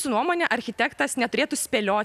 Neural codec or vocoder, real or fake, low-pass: none; real; 14.4 kHz